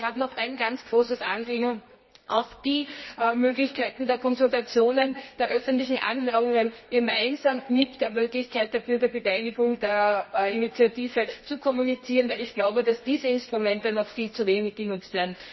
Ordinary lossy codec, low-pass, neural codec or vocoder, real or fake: MP3, 24 kbps; 7.2 kHz; codec, 24 kHz, 0.9 kbps, WavTokenizer, medium music audio release; fake